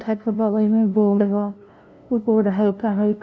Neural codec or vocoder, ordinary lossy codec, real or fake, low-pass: codec, 16 kHz, 0.5 kbps, FunCodec, trained on LibriTTS, 25 frames a second; none; fake; none